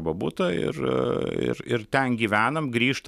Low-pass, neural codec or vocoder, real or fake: 14.4 kHz; none; real